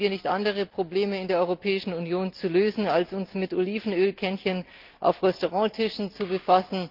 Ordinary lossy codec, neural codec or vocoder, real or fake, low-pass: Opus, 32 kbps; none; real; 5.4 kHz